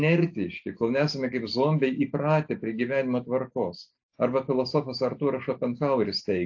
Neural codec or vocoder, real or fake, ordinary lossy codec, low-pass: none; real; AAC, 48 kbps; 7.2 kHz